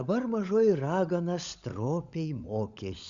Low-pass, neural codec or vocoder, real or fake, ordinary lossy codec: 7.2 kHz; codec, 16 kHz, 16 kbps, FunCodec, trained on LibriTTS, 50 frames a second; fake; Opus, 64 kbps